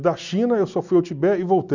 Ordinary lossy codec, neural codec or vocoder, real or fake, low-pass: none; none; real; 7.2 kHz